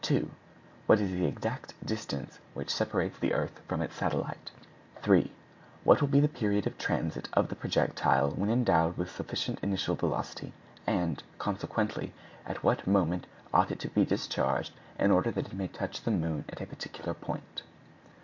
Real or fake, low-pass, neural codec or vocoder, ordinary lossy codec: real; 7.2 kHz; none; MP3, 48 kbps